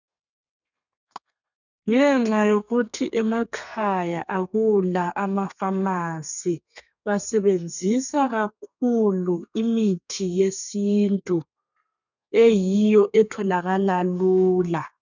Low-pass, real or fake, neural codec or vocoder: 7.2 kHz; fake; codec, 32 kHz, 1.9 kbps, SNAC